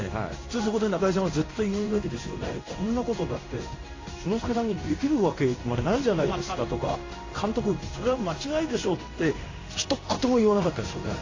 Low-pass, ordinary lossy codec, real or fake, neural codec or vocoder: 7.2 kHz; AAC, 32 kbps; fake; codec, 16 kHz in and 24 kHz out, 1 kbps, XY-Tokenizer